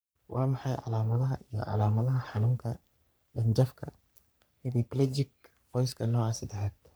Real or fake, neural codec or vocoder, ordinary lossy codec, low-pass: fake; codec, 44.1 kHz, 3.4 kbps, Pupu-Codec; none; none